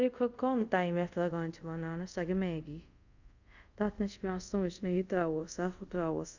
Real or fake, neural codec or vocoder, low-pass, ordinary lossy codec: fake; codec, 24 kHz, 0.5 kbps, DualCodec; 7.2 kHz; none